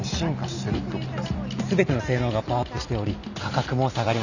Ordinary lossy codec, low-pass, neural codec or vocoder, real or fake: none; 7.2 kHz; none; real